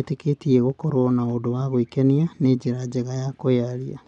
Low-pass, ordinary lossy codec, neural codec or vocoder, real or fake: 10.8 kHz; none; none; real